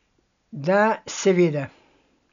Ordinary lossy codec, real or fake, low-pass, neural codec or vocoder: none; real; 7.2 kHz; none